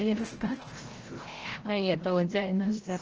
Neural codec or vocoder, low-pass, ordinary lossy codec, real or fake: codec, 16 kHz, 0.5 kbps, FreqCodec, larger model; 7.2 kHz; Opus, 16 kbps; fake